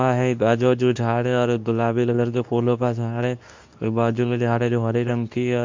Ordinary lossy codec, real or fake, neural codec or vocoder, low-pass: MP3, 64 kbps; fake; codec, 24 kHz, 0.9 kbps, WavTokenizer, medium speech release version 2; 7.2 kHz